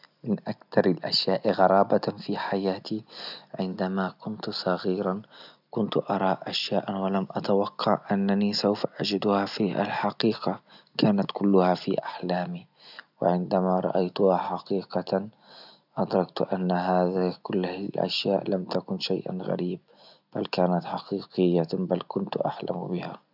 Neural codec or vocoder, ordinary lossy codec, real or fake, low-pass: none; none; real; 5.4 kHz